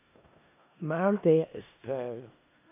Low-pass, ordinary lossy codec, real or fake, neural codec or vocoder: 3.6 kHz; AAC, 24 kbps; fake; codec, 16 kHz in and 24 kHz out, 0.4 kbps, LongCat-Audio-Codec, four codebook decoder